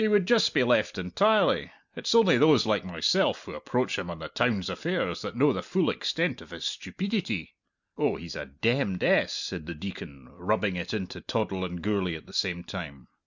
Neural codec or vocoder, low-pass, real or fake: none; 7.2 kHz; real